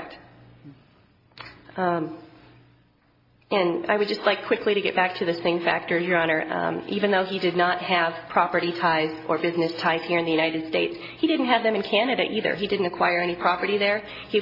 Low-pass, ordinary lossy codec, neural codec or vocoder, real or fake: 5.4 kHz; AAC, 32 kbps; none; real